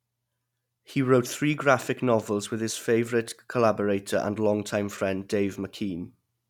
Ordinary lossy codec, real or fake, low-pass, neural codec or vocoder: none; real; 19.8 kHz; none